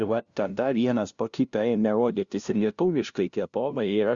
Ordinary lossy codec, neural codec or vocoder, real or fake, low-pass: Opus, 64 kbps; codec, 16 kHz, 0.5 kbps, FunCodec, trained on LibriTTS, 25 frames a second; fake; 7.2 kHz